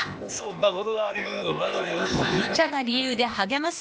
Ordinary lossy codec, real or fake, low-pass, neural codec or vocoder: none; fake; none; codec, 16 kHz, 0.8 kbps, ZipCodec